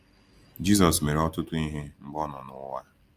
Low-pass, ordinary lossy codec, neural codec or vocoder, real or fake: 14.4 kHz; Opus, 32 kbps; none; real